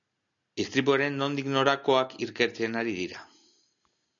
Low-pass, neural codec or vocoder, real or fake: 7.2 kHz; none; real